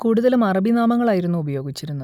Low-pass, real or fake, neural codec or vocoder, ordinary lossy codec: 19.8 kHz; real; none; none